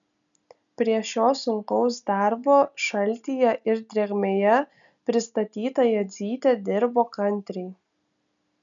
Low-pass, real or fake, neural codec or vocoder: 7.2 kHz; real; none